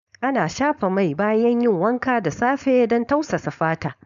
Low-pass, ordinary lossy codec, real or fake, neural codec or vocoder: 7.2 kHz; none; fake; codec, 16 kHz, 4.8 kbps, FACodec